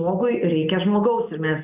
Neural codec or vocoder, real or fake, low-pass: none; real; 3.6 kHz